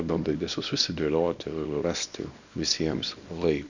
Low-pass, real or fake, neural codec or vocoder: 7.2 kHz; fake; codec, 24 kHz, 0.9 kbps, WavTokenizer, small release